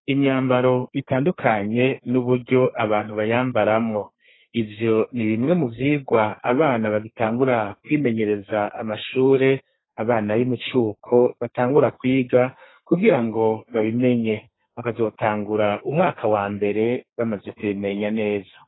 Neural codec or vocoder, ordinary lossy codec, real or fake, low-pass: codec, 32 kHz, 1.9 kbps, SNAC; AAC, 16 kbps; fake; 7.2 kHz